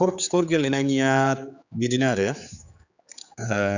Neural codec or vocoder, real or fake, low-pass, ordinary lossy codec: codec, 16 kHz, 4 kbps, X-Codec, HuBERT features, trained on general audio; fake; 7.2 kHz; none